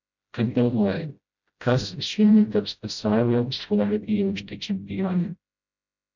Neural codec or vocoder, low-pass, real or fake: codec, 16 kHz, 0.5 kbps, FreqCodec, smaller model; 7.2 kHz; fake